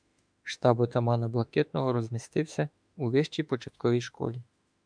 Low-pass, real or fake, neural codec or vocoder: 9.9 kHz; fake; autoencoder, 48 kHz, 32 numbers a frame, DAC-VAE, trained on Japanese speech